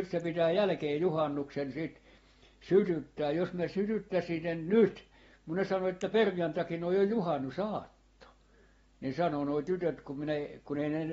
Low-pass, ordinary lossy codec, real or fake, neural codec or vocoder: 19.8 kHz; AAC, 24 kbps; real; none